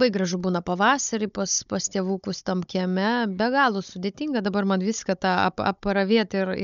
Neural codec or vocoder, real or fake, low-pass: codec, 16 kHz, 16 kbps, FunCodec, trained on Chinese and English, 50 frames a second; fake; 7.2 kHz